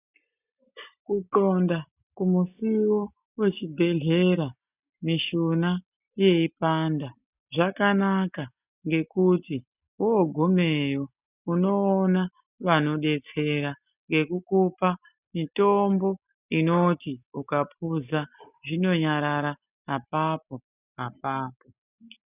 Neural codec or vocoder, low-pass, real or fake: none; 3.6 kHz; real